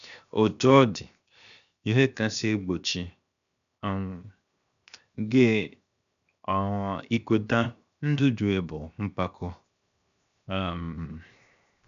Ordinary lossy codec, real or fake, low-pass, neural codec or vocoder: none; fake; 7.2 kHz; codec, 16 kHz, 0.7 kbps, FocalCodec